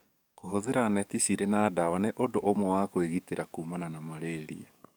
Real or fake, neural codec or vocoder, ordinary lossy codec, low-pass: fake; codec, 44.1 kHz, 7.8 kbps, DAC; none; none